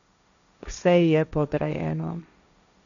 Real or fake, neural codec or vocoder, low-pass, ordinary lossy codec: fake; codec, 16 kHz, 1.1 kbps, Voila-Tokenizer; 7.2 kHz; none